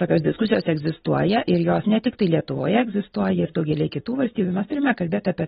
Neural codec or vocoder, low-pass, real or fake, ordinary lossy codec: none; 19.8 kHz; real; AAC, 16 kbps